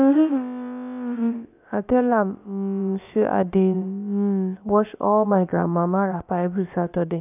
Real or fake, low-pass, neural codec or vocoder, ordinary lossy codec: fake; 3.6 kHz; codec, 16 kHz, about 1 kbps, DyCAST, with the encoder's durations; none